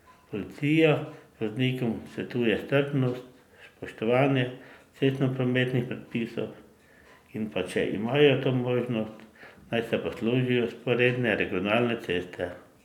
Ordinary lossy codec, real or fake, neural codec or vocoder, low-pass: none; real; none; 19.8 kHz